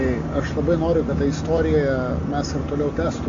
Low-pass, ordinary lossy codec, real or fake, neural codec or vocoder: 7.2 kHz; AAC, 48 kbps; real; none